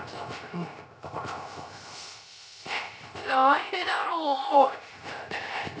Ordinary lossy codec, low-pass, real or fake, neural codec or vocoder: none; none; fake; codec, 16 kHz, 0.3 kbps, FocalCodec